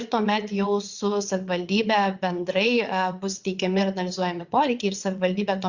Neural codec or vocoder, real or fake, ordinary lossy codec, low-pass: vocoder, 22.05 kHz, 80 mel bands, WaveNeXt; fake; Opus, 64 kbps; 7.2 kHz